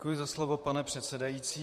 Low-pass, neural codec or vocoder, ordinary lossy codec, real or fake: 14.4 kHz; vocoder, 44.1 kHz, 128 mel bands, Pupu-Vocoder; MP3, 64 kbps; fake